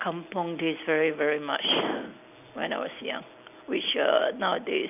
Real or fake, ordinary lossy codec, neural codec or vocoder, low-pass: real; none; none; 3.6 kHz